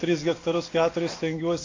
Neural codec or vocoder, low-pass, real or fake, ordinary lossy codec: none; 7.2 kHz; real; AAC, 32 kbps